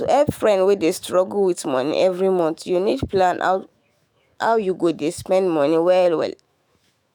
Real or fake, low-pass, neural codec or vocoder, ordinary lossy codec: fake; none; autoencoder, 48 kHz, 128 numbers a frame, DAC-VAE, trained on Japanese speech; none